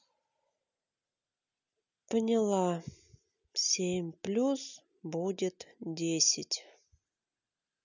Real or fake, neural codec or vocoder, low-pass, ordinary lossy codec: real; none; 7.2 kHz; none